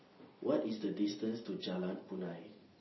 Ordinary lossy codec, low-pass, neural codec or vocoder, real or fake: MP3, 24 kbps; 7.2 kHz; none; real